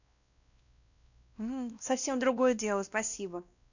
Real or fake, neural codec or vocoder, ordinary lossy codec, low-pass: fake; codec, 16 kHz, 1 kbps, X-Codec, WavLM features, trained on Multilingual LibriSpeech; none; 7.2 kHz